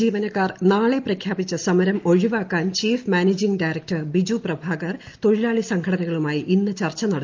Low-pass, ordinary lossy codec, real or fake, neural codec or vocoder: 7.2 kHz; Opus, 24 kbps; real; none